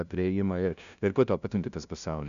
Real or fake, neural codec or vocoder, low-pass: fake; codec, 16 kHz, 0.5 kbps, FunCodec, trained on LibriTTS, 25 frames a second; 7.2 kHz